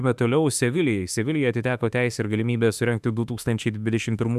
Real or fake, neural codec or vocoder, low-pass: fake; autoencoder, 48 kHz, 32 numbers a frame, DAC-VAE, trained on Japanese speech; 14.4 kHz